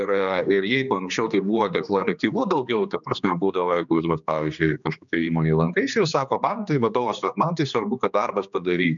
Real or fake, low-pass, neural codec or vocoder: fake; 7.2 kHz; codec, 16 kHz, 2 kbps, X-Codec, HuBERT features, trained on general audio